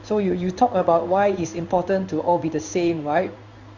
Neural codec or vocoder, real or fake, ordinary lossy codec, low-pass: codec, 16 kHz in and 24 kHz out, 1 kbps, XY-Tokenizer; fake; Opus, 64 kbps; 7.2 kHz